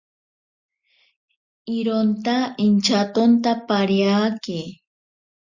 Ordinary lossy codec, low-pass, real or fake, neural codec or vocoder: Opus, 64 kbps; 7.2 kHz; real; none